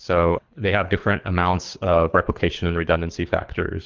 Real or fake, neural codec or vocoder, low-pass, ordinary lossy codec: fake; codec, 24 kHz, 3 kbps, HILCodec; 7.2 kHz; Opus, 24 kbps